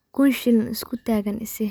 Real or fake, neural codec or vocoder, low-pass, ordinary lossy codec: real; none; none; none